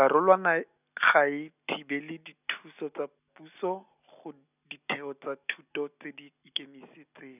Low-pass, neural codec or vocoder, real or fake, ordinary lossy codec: 3.6 kHz; none; real; none